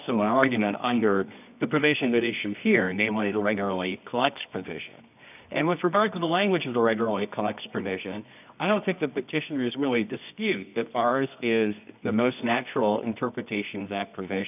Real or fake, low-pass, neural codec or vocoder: fake; 3.6 kHz; codec, 24 kHz, 0.9 kbps, WavTokenizer, medium music audio release